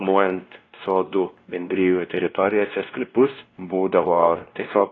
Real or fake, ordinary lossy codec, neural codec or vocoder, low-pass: fake; AAC, 32 kbps; codec, 16 kHz, 1 kbps, X-Codec, WavLM features, trained on Multilingual LibriSpeech; 7.2 kHz